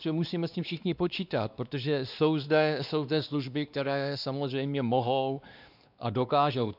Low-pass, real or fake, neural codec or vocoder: 5.4 kHz; fake; codec, 16 kHz, 2 kbps, X-Codec, WavLM features, trained on Multilingual LibriSpeech